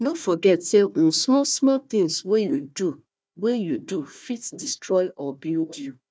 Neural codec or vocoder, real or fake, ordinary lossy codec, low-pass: codec, 16 kHz, 1 kbps, FunCodec, trained on Chinese and English, 50 frames a second; fake; none; none